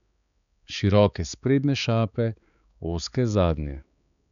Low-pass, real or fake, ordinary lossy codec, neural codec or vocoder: 7.2 kHz; fake; none; codec, 16 kHz, 4 kbps, X-Codec, HuBERT features, trained on balanced general audio